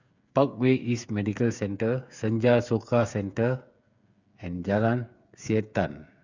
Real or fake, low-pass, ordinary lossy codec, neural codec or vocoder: fake; 7.2 kHz; Opus, 64 kbps; codec, 16 kHz, 8 kbps, FreqCodec, smaller model